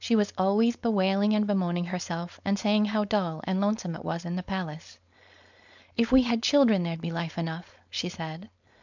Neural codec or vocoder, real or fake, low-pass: codec, 16 kHz, 4.8 kbps, FACodec; fake; 7.2 kHz